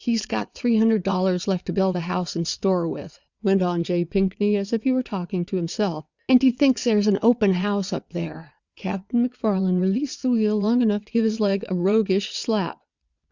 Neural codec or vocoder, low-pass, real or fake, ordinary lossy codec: vocoder, 22.05 kHz, 80 mel bands, WaveNeXt; 7.2 kHz; fake; Opus, 64 kbps